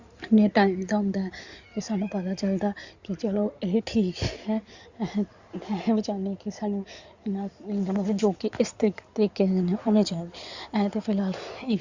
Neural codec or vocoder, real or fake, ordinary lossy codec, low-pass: codec, 16 kHz in and 24 kHz out, 2.2 kbps, FireRedTTS-2 codec; fake; Opus, 64 kbps; 7.2 kHz